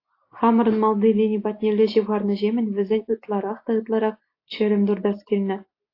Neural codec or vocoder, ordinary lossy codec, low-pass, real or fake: none; AAC, 32 kbps; 5.4 kHz; real